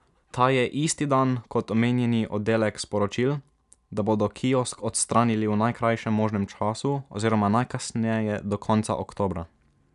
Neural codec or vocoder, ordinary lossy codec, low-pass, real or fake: none; none; 10.8 kHz; real